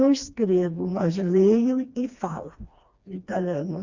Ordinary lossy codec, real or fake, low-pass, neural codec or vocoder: Opus, 64 kbps; fake; 7.2 kHz; codec, 16 kHz, 2 kbps, FreqCodec, smaller model